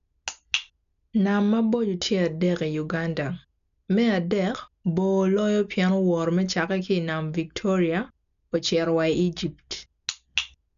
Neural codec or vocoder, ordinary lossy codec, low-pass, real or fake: none; none; 7.2 kHz; real